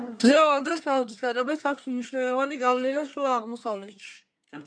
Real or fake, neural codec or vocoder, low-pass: fake; codec, 24 kHz, 1 kbps, SNAC; 9.9 kHz